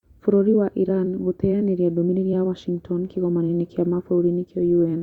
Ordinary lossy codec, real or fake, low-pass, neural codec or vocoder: Opus, 64 kbps; fake; 19.8 kHz; vocoder, 44.1 kHz, 128 mel bands every 256 samples, BigVGAN v2